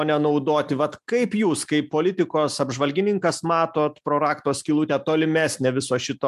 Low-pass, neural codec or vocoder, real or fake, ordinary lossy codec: 14.4 kHz; none; real; MP3, 96 kbps